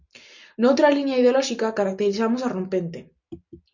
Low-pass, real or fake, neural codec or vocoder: 7.2 kHz; real; none